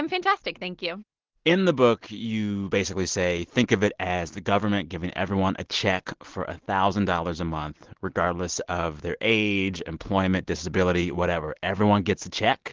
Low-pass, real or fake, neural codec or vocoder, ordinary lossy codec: 7.2 kHz; real; none; Opus, 16 kbps